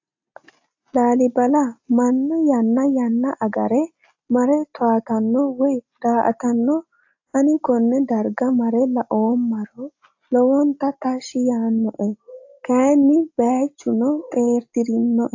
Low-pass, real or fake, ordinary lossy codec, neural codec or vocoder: 7.2 kHz; real; AAC, 48 kbps; none